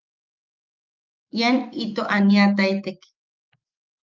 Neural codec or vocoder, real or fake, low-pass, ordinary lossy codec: autoencoder, 48 kHz, 128 numbers a frame, DAC-VAE, trained on Japanese speech; fake; 7.2 kHz; Opus, 24 kbps